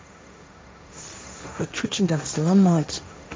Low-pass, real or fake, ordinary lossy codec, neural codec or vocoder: none; fake; none; codec, 16 kHz, 1.1 kbps, Voila-Tokenizer